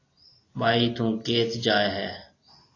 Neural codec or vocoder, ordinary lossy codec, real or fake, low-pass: none; AAC, 32 kbps; real; 7.2 kHz